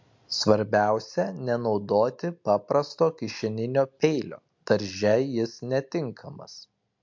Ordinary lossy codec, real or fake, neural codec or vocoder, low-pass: MP3, 48 kbps; real; none; 7.2 kHz